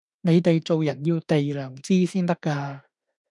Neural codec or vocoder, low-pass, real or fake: autoencoder, 48 kHz, 32 numbers a frame, DAC-VAE, trained on Japanese speech; 10.8 kHz; fake